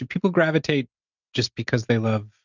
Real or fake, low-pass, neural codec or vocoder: real; 7.2 kHz; none